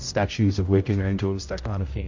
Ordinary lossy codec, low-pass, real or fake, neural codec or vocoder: MP3, 48 kbps; 7.2 kHz; fake; codec, 16 kHz, 0.5 kbps, X-Codec, HuBERT features, trained on general audio